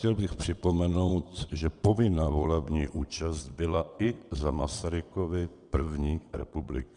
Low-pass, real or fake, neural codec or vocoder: 9.9 kHz; fake; vocoder, 22.05 kHz, 80 mel bands, WaveNeXt